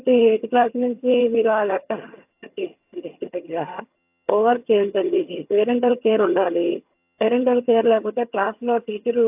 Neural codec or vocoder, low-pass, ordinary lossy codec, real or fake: vocoder, 22.05 kHz, 80 mel bands, HiFi-GAN; 3.6 kHz; AAC, 32 kbps; fake